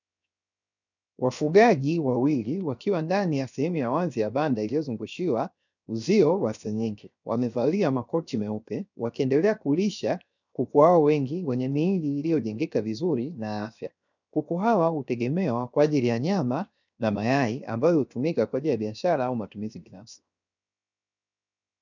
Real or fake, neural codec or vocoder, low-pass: fake; codec, 16 kHz, 0.7 kbps, FocalCodec; 7.2 kHz